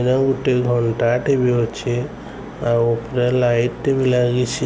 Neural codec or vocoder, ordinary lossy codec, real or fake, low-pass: none; none; real; none